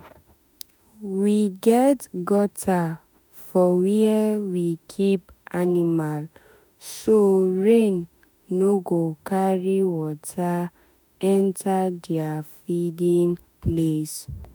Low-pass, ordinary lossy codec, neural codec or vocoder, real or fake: none; none; autoencoder, 48 kHz, 32 numbers a frame, DAC-VAE, trained on Japanese speech; fake